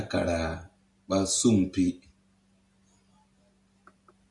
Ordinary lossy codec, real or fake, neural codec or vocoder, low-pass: AAC, 64 kbps; real; none; 10.8 kHz